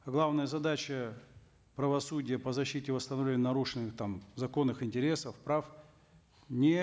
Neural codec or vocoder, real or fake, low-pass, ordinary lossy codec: none; real; none; none